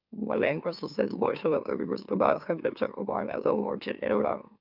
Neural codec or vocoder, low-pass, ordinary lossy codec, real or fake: autoencoder, 44.1 kHz, a latent of 192 numbers a frame, MeloTTS; 5.4 kHz; none; fake